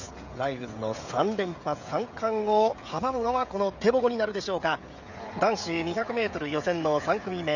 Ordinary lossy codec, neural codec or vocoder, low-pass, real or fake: none; codec, 16 kHz, 16 kbps, FreqCodec, smaller model; 7.2 kHz; fake